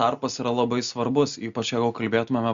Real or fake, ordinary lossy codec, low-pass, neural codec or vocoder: real; Opus, 64 kbps; 7.2 kHz; none